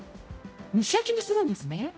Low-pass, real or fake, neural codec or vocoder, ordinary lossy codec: none; fake; codec, 16 kHz, 0.5 kbps, X-Codec, HuBERT features, trained on general audio; none